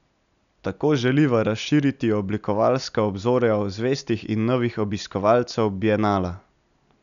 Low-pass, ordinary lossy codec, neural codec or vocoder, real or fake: 7.2 kHz; none; none; real